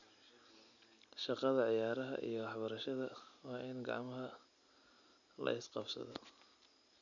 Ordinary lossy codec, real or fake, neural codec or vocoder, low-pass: none; real; none; 7.2 kHz